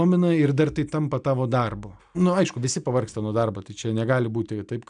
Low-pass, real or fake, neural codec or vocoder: 9.9 kHz; real; none